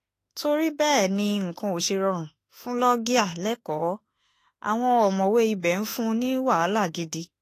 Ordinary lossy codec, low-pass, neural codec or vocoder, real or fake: AAC, 48 kbps; 14.4 kHz; autoencoder, 48 kHz, 32 numbers a frame, DAC-VAE, trained on Japanese speech; fake